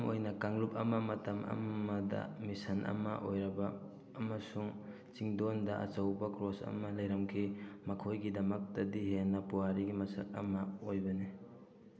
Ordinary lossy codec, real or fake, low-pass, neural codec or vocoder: none; real; none; none